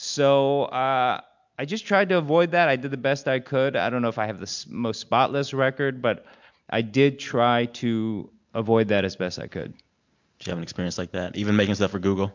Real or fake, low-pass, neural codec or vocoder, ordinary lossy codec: real; 7.2 kHz; none; MP3, 64 kbps